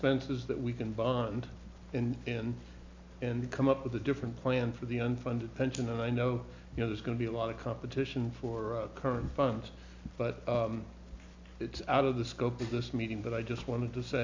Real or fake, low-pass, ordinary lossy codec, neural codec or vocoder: real; 7.2 kHz; MP3, 64 kbps; none